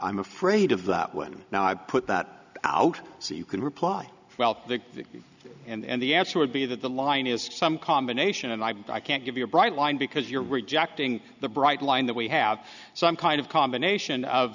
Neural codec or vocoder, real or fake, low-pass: none; real; 7.2 kHz